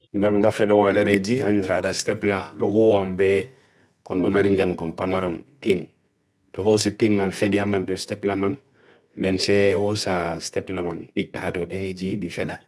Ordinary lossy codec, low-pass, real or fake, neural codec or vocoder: none; none; fake; codec, 24 kHz, 0.9 kbps, WavTokenizer, medium music audio release